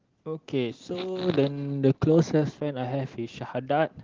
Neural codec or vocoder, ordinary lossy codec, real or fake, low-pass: none; Opus, 16 kbps; real; 7.2 kHz